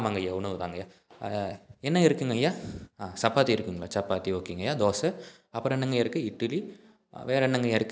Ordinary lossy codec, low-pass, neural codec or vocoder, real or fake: none; none; none; real